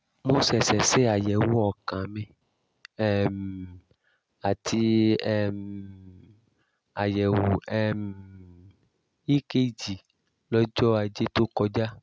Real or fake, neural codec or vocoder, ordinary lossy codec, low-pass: real; none; none; none